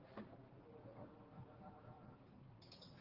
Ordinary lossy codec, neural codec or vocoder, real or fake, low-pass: Opus, 16 kbps; vocoder, 44.1 kHz, 80 mel bands, Vocos; fake; 5.4 kHz